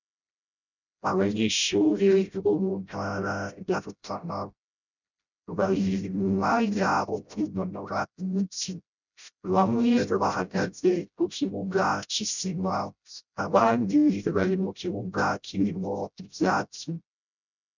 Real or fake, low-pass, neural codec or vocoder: fake; 7.2 kHz; codec, 16 kHz, 0.5 kbps, FreqCodec, smaller model